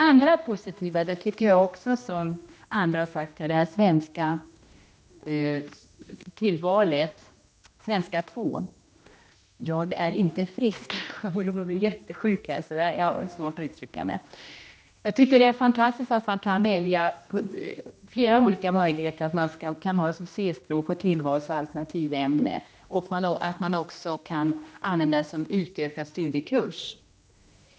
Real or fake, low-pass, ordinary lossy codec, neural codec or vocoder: fake; none; none; codec, 16 kHz, 1 kbps, X-Codec, HuBERT features, trained on general audio